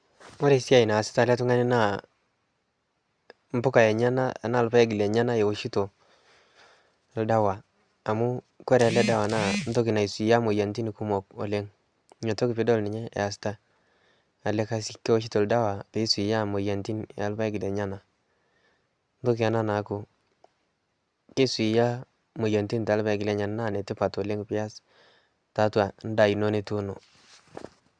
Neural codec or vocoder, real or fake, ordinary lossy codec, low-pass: none; real; Opus, 64 kbps; 9.9 kHz